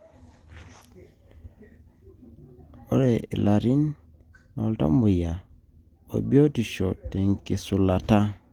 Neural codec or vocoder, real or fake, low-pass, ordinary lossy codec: none; real; 19.8 kHz; Opus, 24 kbps